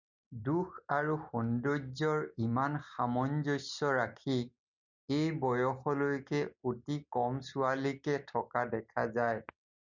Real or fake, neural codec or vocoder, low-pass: real; none; 7.2 kHz